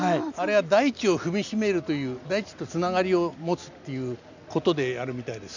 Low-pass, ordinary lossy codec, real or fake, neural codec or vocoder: 7.2 kHz; none; real; none